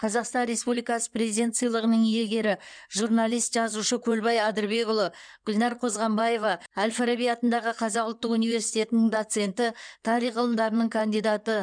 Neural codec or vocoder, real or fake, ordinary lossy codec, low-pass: codec, 16 kHz in and 24 kHz out, 2.2 kbps, FireRedTTS-2 codec; fake; none; 9.9 kHz